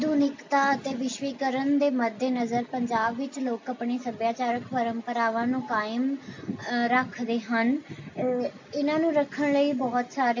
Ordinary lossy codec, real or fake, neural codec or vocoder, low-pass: MP3, 48 kbps; real; none; 7.2 kHz